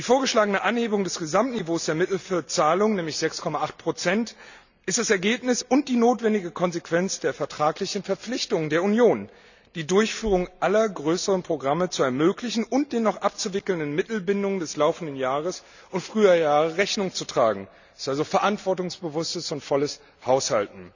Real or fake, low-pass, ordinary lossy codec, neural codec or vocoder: real; 7.2 kHz; none; none